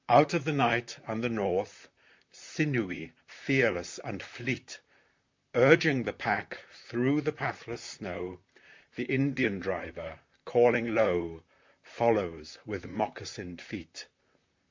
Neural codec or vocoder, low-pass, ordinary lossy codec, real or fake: vocoder, 44.1 kHz, 128 mel bands, Pupu-Vocoder; 7.2 kHz; MP3, 64 kbps; fake